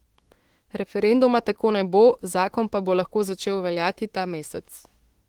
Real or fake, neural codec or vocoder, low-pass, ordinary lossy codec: fake; autoencoder, 48 kHz, 32 numbers a frame, DAC-VAE, trained on Japanese speech; 19.8 kHz; Opus, 16 kbps